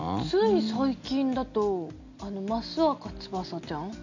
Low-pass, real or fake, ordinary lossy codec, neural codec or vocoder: 7.2 kHz; real; none; none